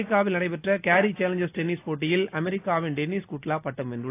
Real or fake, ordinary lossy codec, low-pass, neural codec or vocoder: real; AAC, 24 kbps; 3.6 kHz; none